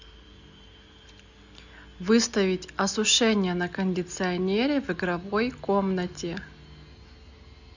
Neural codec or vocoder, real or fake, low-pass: none; real; 7.2 kHz